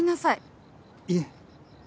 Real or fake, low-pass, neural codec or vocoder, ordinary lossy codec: real; none; none; none